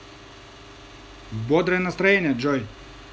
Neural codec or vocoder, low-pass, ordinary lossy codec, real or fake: none; none; none; real